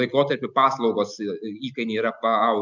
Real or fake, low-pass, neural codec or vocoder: real; 7.2 kHz; none